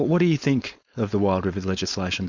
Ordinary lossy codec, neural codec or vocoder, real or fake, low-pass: Opus, 64 kbps; codec, 16 kHz, 4.8 kbps, FACodec; fake; 7.2 kHz